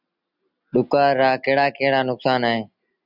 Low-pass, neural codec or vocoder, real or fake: 5.4 kHz; none; real